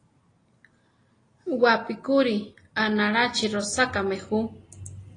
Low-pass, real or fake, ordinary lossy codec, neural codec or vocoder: 9.9 kHz; real; AAC, 32 kbps; none